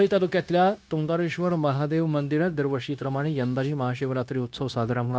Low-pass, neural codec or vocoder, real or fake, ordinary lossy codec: none; codec, 16 kHz, 0.9 kbps, LongCat-Audio-Codec; fake; none